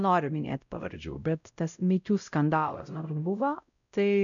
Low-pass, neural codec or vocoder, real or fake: 7.2 kHz; codec, 16 kHz, 0.5 kbps, X-Codec, WavLM features, trained on Multilingual LibriSpeech; fake